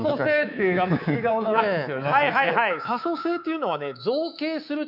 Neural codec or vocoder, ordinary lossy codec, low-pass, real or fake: codec, 24 kHz, 3.1 kbps, DualCodec; none; 5.4 kHz; fake